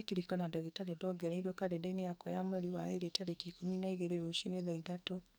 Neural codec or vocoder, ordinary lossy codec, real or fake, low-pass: codec, 44.1 kHz, 2.6 kbps, SNAC; none; fake; none